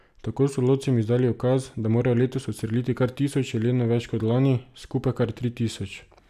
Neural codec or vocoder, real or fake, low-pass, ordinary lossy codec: none; real; 14.4 kHz; none